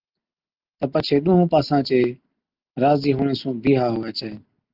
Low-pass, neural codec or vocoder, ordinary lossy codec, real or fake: 5.4 kHz; none; Opus, 32 kbps; real